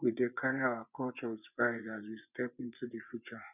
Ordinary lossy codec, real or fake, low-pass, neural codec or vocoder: none; fake; 3.6 kHz; vocoder, 24 kHz, 100 mel bands, Vocos